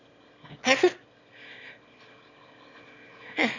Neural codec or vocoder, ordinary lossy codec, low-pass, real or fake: autoencoder, 22.05 kHz, a latent of 192 numbers a frame, VITS, trained on one speaker; none; 7.2 kHz; fake